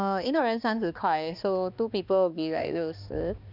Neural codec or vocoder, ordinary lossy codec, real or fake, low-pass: codec, 16 kHz, 2 kbps, X-Codec, HuBERT features, trained on balanced general audio; none; fake; 5.4 kHz